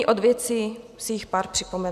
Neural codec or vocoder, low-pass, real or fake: none; 14.4 kHz; real